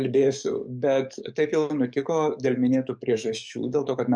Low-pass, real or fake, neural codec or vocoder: 9.9 kHz; fake; codec, 44.1 kHz, 7.8 kbps, DAC